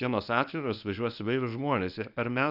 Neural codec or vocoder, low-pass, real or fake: codec, 24 kHz, 0.9 kbps, WavTokenizer, medium speech release version 1; 5.4 kHz; fake